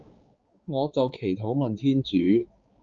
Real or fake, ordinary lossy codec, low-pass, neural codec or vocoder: fake; Opus, 24 kbps; 7.2 kHz; codec, 16 kHz, 4 kbps, X-Codec, HuBERT features, trained on balanced general audio